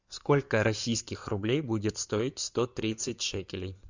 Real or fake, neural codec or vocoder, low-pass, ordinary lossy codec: fake; codec, 16 kHz in and 24 kHz out, 2.2 kbps, FireRedTTS-2 codec; 7.2 kHz; Opus, 64 kbps